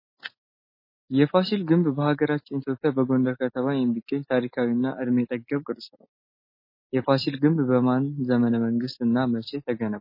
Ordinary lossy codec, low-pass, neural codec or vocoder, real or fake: MP3, 24 kbps; 5.4 kHz; none; real